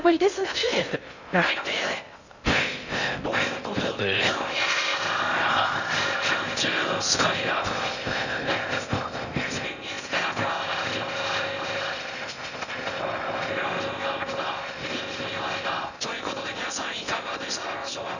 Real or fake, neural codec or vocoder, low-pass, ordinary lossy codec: fake; codec, 16 kHz in and 24 kHz out, 0.6 kbps, FocalCodec, streaming, 2048 codes; 7.2 kHz; none